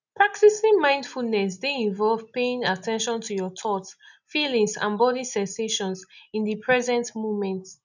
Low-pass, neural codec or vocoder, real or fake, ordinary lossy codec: 7.2 kHz; none; real; none